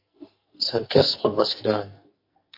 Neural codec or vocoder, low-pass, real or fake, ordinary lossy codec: codec, 44.1 kHz, 2.6 kbps, SNAC; 5.4 kHz; fake; MP3, 32 kbps